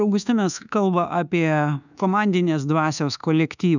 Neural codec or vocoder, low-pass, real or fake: codec, 24 kHz, 1.2 kbps, DualCodec; 7.2 kHz; fake